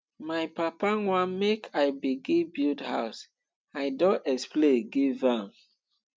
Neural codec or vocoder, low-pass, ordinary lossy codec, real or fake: none; none; none; real